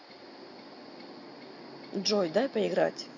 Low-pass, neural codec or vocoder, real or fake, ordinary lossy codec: 7.2 kHz; none; real; none